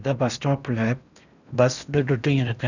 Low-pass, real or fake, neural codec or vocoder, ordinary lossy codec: 7.2 kHz; fake; codec, 16 kHz in and 24 kHz out, 0.8 kbps, FocalCodec, streaming, 65536 codes; none